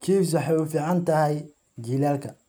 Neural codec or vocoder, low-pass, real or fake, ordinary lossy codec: none; none; real; none